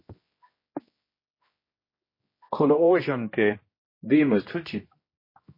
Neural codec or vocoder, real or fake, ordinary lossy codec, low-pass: codec, 16 kHz, 1 kbps, X-Codec, HuBERT features, trained on general audio; fake; MP3, 24 kbps; 5.4 kHz